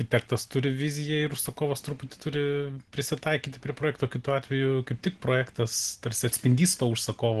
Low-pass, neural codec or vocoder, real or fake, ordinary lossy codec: 10.8 kHz; none; real; Opus, 16 kbps